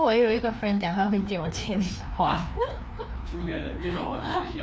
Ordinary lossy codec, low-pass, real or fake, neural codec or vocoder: none; none; fake; codec, 16 kHz, 2 kbps, FreqCodec, larger model